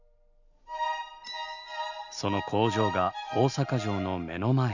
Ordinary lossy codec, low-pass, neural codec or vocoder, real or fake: none; 7.2 kHz; none; real